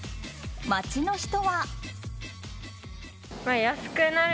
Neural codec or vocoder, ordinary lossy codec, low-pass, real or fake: none; none; none; real